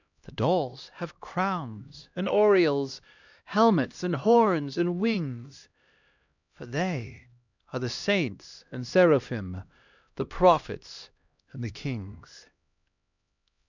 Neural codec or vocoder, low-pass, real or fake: codec, 16 kHz, 1 kbps, X-Codec, HuBERT features, trained on LibriSpeech; 7.2 kHz; fake